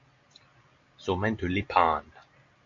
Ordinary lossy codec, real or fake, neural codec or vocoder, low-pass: AAC, 48 kbps; real; none; 7.2 kHz